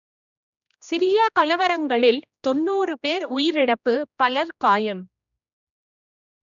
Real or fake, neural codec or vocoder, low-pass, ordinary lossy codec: fake; codec, 16 kHz, 1 kbps, X-Codec, HuBERT features, trained on general audio; 7.2 kHz; none